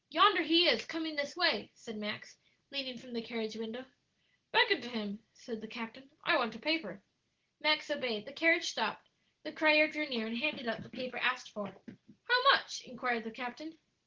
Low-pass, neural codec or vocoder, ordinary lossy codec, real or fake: 7.2 kHz; none; Opus, 16 kbps; real